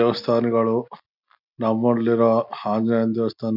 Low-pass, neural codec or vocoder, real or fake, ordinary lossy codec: 5.4 kHz; none; real; none